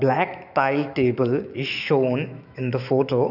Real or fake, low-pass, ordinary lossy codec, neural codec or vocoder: fake; 5.4 kHz; none; autoencoder, 48 kHz, 128 numbers a frame, DAC-VAE, trained on Japanese speech